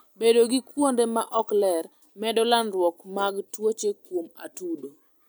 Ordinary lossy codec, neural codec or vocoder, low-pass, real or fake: none; none; none; real